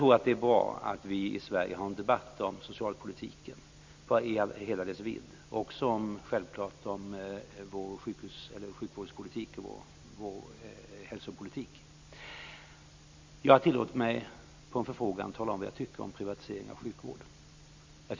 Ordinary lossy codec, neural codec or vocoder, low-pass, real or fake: MP3, 64 kbps; none; 7.2 kHz; real